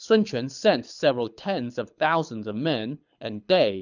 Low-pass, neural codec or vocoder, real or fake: 7.2 kHz; codec, 24 kHz, 6 kbps, HILCodec; fake